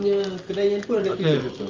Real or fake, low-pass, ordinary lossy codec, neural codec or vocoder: real; 7.2 kHz; Opus, 32 kbps; none